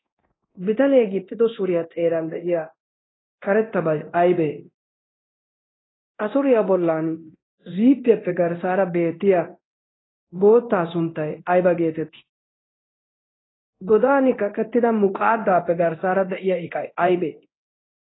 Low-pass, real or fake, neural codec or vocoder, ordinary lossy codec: 7.2 kHz; fake; codec, 24 kHz, 0.9 kbps, DualCodec; AAC, 16 kbps